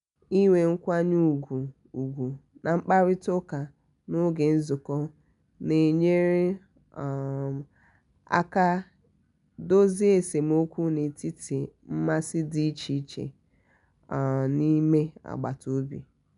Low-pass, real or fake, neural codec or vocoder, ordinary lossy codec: 10.8 kHz; real; none; none